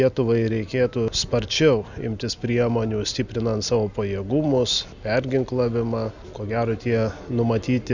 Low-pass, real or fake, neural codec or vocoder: 7.2 kHz; real; none